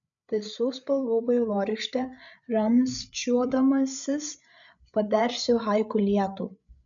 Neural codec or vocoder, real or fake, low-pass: codec, 16 kHz, 8 kbps, FreqCodec, larger model; fake; 7.2 kHz